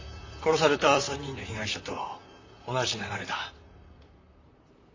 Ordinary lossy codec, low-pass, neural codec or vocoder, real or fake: AAC, 48 kbps; 7.2 kHz; vocoder, 44.1 kHz, 128 mel bands, Pupu-Vocoder; fake